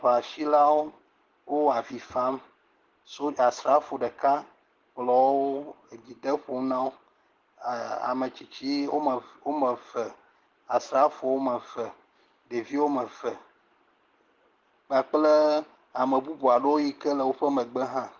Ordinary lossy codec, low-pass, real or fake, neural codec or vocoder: Opus, 16 kbps; 7.2 kHz; real; none